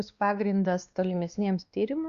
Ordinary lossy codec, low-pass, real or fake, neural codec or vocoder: Opus, 64 kbps; 7.2 kHz; fake; codec, 16 kHz, 2 kbps, X-Codec, WavLM features, trained on Multilingual LibriSpeech